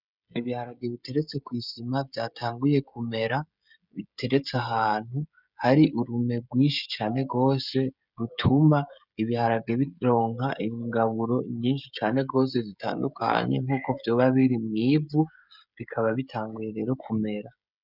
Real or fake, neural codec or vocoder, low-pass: fake; codec, 16 kHz, 16 kbps, FreqCodec, smaller model; 5.4 kHz